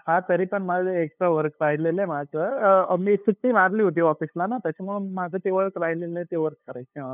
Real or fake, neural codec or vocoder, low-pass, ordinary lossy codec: fake; codec, 16 kHz, 2 kbps, FunCodec, trained on LibriTTS, 25 frames a second; 3.6 kHz; none